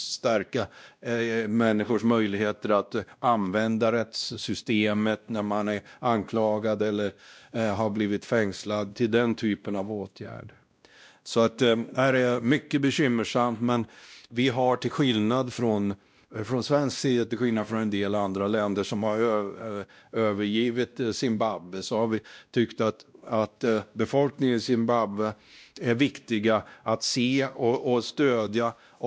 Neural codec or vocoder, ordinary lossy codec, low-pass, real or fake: codec, 16 kHz, 1 kbps, X-Codec, WavLM features, trained on Multilingual LibriSpeech; none; none; fake